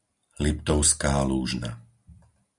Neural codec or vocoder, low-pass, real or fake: none; 10.8 kHz; real